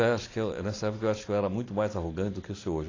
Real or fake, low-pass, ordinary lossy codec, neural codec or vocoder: real; 7.2 kHz; AAC, 32 kbps; none